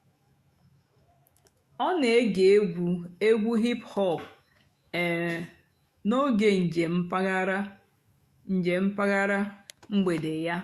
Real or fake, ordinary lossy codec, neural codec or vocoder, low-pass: fake; Opus, 64 kbps; autoencoder, 48 kHz, 128 numbers a frame, DAC-VAE, trained on Japanese speech; 14.4 kHz